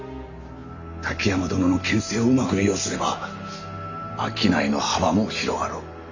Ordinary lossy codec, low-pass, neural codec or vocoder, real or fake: none; 7.2 kHz; none; real